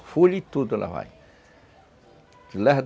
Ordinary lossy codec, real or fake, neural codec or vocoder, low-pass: none; real; none; none